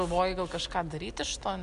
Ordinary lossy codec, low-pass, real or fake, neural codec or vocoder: Opus, 64 kbps; 10.8 kHz; real; none